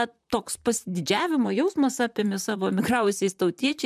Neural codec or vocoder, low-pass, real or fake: vocoder, 44.1 kHz, 128 mel bands, Pupu-Vocoder; 14.4 kHz; fake